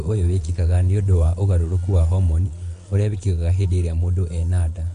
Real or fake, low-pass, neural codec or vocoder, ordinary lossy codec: fake; 9.9 kHz; vocoder, 22.05 kHz, 80 mel bands, Vocos; MP3, 48 kbps